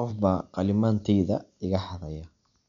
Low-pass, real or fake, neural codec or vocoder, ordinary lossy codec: 7.2 kHz; real; none; none